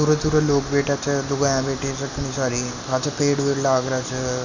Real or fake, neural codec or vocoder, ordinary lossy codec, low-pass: real; none; none; 7.2 kHz